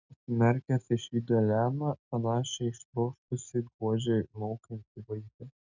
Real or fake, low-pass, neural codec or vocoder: real; 7.2 kHz; none